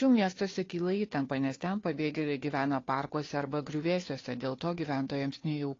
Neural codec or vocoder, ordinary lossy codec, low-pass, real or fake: codec, 16 kHz, 2 kbps, FunCodec, trained on Chinese and English, 25 frames a second; AAC, 32 kbps; 7.2 kHz; fake